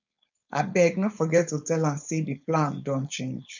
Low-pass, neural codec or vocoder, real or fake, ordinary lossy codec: 7.2 kHz; codec, 16 kHz, 4.8 kbps, FACodec; fake; none